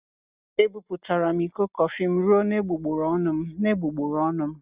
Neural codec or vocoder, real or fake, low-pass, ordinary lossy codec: none; real; 3.6 kHz; Opus, 64 kbps